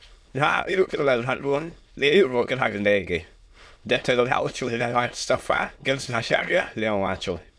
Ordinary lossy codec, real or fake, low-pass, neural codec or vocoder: none; fake; none; autoencoder, 22.05 kHz, a latent of 192 numbers a frame, VITS, trained on many speakers